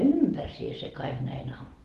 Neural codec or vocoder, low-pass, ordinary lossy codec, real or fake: none; 14.4 kHz; Opus, 16 kbps; real